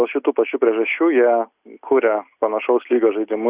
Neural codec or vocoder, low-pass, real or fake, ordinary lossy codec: none; 3.6 kHz; real; Opus, 64 kbps